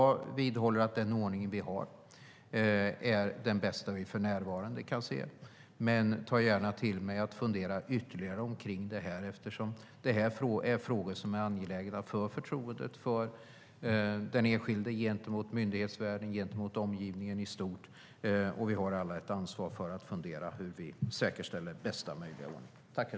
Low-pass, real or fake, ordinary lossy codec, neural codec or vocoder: none; real; none; none